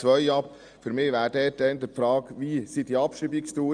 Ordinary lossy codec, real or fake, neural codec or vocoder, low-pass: Opus, 32 kbps; real; none; 9.9 kHz